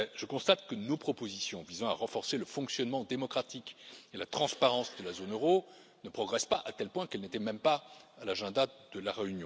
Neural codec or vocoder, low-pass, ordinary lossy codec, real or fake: none; none; none; real